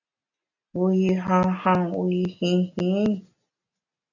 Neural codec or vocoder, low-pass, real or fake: none; 7.2 kHz; real